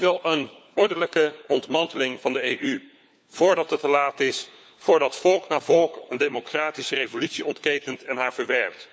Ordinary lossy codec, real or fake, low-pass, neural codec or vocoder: none; fake; none; codec, 16 kHz, 4 kbps, FunCodec, trained on LibriTTS, 50 frames a second